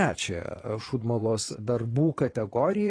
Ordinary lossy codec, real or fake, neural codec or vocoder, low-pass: AAC, 32 kbps; fake; vocoder, 22.05 kHz, 80 mel bands, Vocos; 9.9 kHz